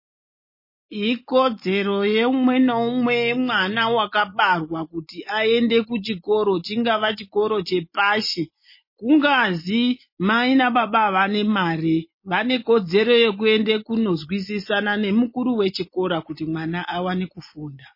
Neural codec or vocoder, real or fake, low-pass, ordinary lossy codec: none; real; 5.4 kHz; MP3, 24 kbps